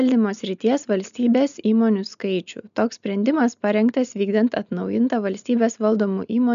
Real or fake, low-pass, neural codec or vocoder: real; 7.2 kHz; none